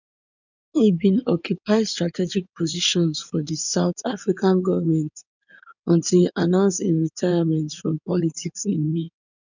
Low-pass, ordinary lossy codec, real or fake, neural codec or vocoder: 7.2 kHz; none; fake; codec, 16 kHz in and 24 kHz out, 2.2 kbps, FireRedTTS-2 codec